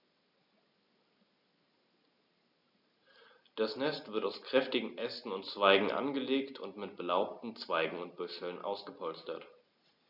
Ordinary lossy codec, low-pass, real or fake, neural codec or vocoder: none; 5.4 kHz; real; none